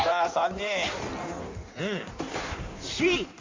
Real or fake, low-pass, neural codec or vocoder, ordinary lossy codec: fake; 7.2 kHz; codec, 16 kHz in and 24 kHz out, 1.1 kbps, FireRedTTS-2 codec; MP3, 48 kbps